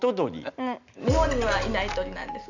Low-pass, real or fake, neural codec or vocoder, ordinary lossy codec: 7.2 kHz; real; none; none